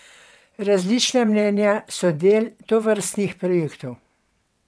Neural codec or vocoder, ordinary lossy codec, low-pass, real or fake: vocoder, 22.05 kHz, 80 mel bands, WaveNeXt; none; none; fake